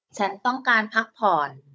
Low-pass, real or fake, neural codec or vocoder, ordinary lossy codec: none; fake; codec, 16 kHz, 16 kbps, FunCodec, trained on Chinese and English, 50 frames a second; none